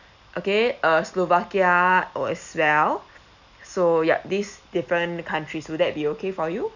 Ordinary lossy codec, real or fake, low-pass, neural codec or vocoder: none; real; 7.2 kHz; none